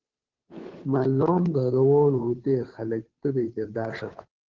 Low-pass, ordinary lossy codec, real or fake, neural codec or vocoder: 7.2 kHz; Opus, 16 kbps; fake; codec, 16 kHz, 2 kbps, FunCodec, trained on Chinese and English, 25 frames a second